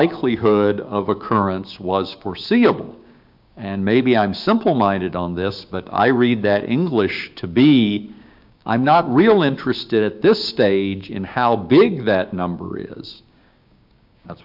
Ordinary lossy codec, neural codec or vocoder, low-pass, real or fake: MP3, 48 kbps; codec, 16 kHz, 6 kbps, DAC; 5.4 kHz; fake